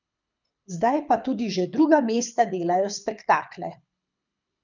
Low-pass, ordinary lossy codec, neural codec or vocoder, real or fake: 7.2 kHz; none; codec, 24 kHz, 6 kbps, HILCodec; fake